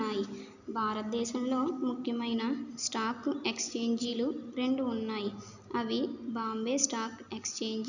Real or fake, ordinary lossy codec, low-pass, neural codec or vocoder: real; none; 7.2 kHz; none